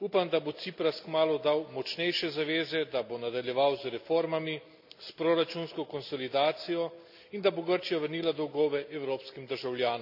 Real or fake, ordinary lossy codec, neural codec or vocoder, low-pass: real; none; none; 5.4 kHz